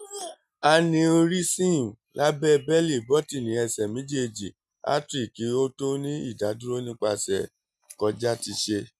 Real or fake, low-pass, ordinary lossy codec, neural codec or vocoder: real; none; none; none